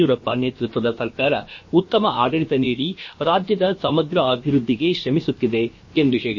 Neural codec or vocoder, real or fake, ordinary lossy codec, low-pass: codec, 16 kHz, about 1 kbps, DyCAST, with the encoder's durations; fake; MP3, 32 kbps; 7.2 kHz